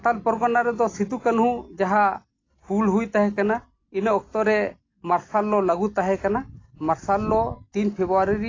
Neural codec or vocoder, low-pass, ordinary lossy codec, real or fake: none; 7.2 kHz; AAC, 32 kbps; real